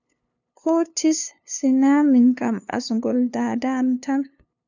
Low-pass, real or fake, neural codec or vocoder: 7.2 kHz; fake; codec, 16 kHz, 2 kbps, FunCodec, trained on LibriTTS, 25 frames a second